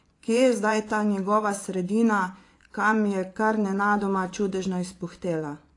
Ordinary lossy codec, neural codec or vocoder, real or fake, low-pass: AAC, 48 kbps; vocoder, 24 kHz, 100 mel bands, Vocos; fake; 10.8 kHz